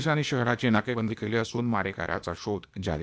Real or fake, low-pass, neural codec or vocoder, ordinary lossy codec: fake; none; codec, 16 kHz, 0.8 kbps, ZipCodec; none